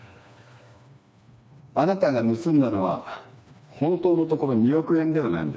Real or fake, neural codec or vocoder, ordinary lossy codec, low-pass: fake; codec, 16 kHz, 2 kbps, FreqCodec, smaller model; none; none